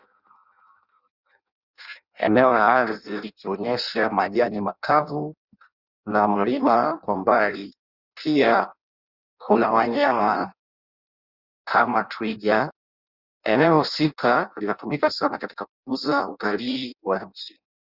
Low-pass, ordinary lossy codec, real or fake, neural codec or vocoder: 5.4 kHz; Opus, 64 kbps; fake; codec, 16 kHz in and 24 kHz out, 0.6 kbps, FireRedTTS-2 codec